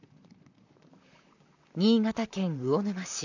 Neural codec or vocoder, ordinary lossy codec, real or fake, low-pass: vocoder, 44.1 kHz, 128 mel bands, Pupu-Vocoder; none; fake; 7.2 kHz